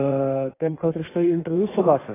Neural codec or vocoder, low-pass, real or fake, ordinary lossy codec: codec, 16 kHz in and 24 kHz out, 1.1 kbps, FireRedTTS-2 codec; 3.6 kHz; fake; AAC, 16 kbps